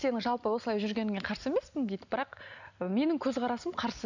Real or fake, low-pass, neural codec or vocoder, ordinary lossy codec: real; 7.2 kHz; none; none